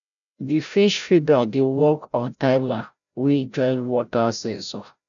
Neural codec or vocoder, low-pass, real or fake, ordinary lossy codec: codec, 16 kHz, 0.5 kbps, FreqCodec, larger model; 7.2 kHz; fake; none